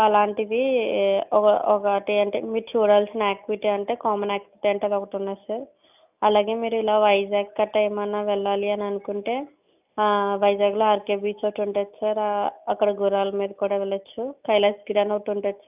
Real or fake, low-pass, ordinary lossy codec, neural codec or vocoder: real; 3.6 kHz; none; none